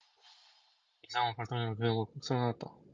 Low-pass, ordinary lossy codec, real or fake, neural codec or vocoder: 7.2 kHz; Opus, 24 kbps; real; none